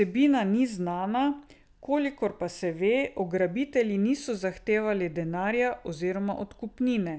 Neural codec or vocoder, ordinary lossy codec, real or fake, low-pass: none; none; real; none